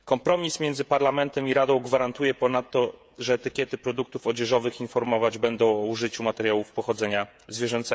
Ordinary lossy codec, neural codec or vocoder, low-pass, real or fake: none; codec, 16 kHz, 16 kbps, FreqCodec, smaller model; none; fake